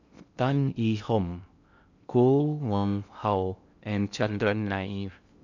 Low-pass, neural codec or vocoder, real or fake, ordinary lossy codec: 7.2 kHz; codec, 16 kHz in and 24 kHz out, 0.6 kbps, FocalCodec, streaming, 2048 codes; fake; none